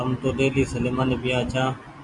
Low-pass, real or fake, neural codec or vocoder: 10.8 kHz; real; none